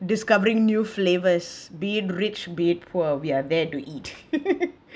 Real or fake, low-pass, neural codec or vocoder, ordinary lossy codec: real; none; none; none